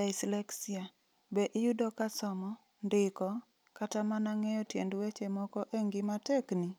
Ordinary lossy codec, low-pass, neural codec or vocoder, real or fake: none; none; none; real